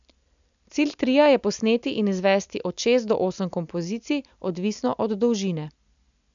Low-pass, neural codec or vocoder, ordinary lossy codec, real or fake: 7.2 kHz; none; none; real